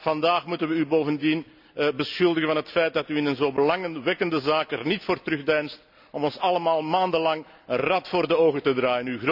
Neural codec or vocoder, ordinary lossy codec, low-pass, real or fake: none; none; 5.4 kHz; real